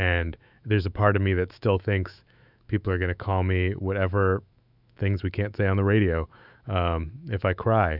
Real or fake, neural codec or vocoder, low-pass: fake; autoencoder, 48 kHz, 128 numbers a frame, DAC-VAE, trained on Japanese speech; 5.4 kHz